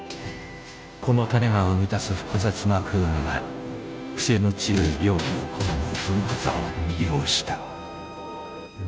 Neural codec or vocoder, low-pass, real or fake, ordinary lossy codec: codec, 16 kHz, 0.5 kbps, FunCodec, trained on Chinese and English, 25 frames a second; none; fake; none